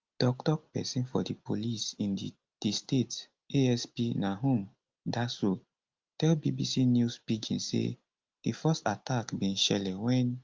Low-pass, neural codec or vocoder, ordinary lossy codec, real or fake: 7.2 kHz; none; Opus, 32 kbps; real